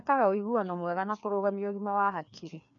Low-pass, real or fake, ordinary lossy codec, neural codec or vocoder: 7.2 kHz; fake; none; codec, 16 kHz, 2 kbps, FreqCodec, larger model